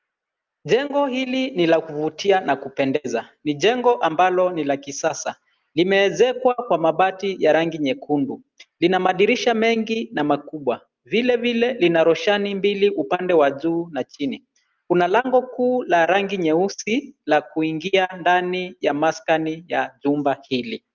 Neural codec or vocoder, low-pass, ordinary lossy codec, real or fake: none; 7.2 kHz; Opus, 32 kbps; real